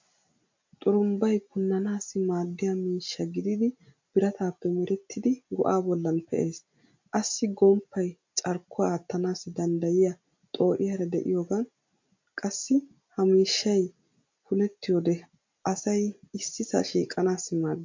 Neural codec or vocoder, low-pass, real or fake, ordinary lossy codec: none; 7.2 kHz; real; MP3, 48 kbps